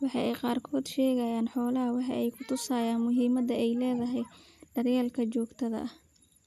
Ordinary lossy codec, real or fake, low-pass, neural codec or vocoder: none; real; 14.4 kHz; none